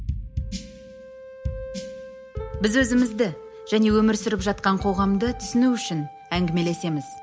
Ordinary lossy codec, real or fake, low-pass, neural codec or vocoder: none; real; none; none